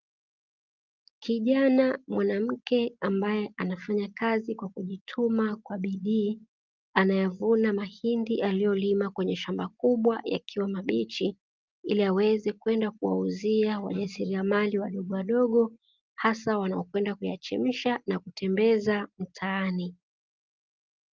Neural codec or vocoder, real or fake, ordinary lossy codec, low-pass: none; real; Opus, 24 kbps; 7.2 kHz